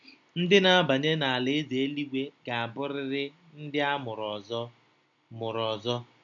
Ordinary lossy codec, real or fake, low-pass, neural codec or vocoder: none; real; 7.2 kHz; none